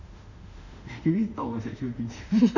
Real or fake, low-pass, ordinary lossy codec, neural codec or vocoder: fake; 7.2 kHz; none; autoencoder, 48 kHz, 32 numbers a frame, DAC-VAE, trained on Japanese speech